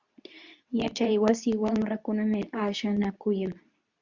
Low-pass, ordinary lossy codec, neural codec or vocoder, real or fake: 7.2 kHz; Opus, 64 kbps; codec, 24 kHz, 0.9 kbps, WavTokenizer, medium speech release version 2; fake